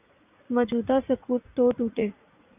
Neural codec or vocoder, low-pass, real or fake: vocoder, 44.1 kHz, 80 mel bands, Vocos; 3.6 kHz; fake